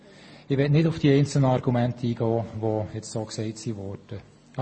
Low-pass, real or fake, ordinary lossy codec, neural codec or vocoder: 9.9 kHz; real; MP3, 32 kbps; none